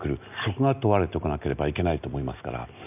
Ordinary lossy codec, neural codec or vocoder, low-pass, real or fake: none; none; 3.6 kHz; real